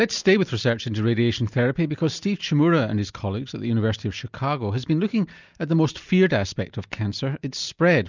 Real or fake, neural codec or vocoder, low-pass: real; none; 7.2 kHz